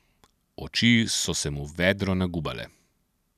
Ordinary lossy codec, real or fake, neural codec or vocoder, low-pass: none; real; none; 14.4 kHz